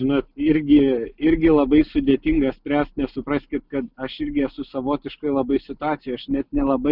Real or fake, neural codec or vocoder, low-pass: real; none; 5.4 kHz